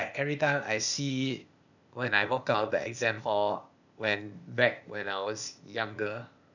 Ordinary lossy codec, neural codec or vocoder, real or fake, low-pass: none; codec, 16 kHz, 0.8 kbps, ZipCodec; fake; 7.2 kHz